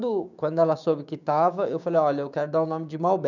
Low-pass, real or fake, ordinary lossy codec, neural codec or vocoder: 7.2 kHz; fake; none; codec, 16 kHz, 6 kbps, DAC